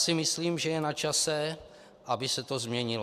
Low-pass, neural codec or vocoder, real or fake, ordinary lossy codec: 14.4 kHz; none; real; AAC, 96 kbps